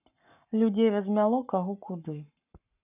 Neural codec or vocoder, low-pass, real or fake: codec, 44.1 kHz, 7.8 kbps, Pupu-Codec; 3.6 kHz; fake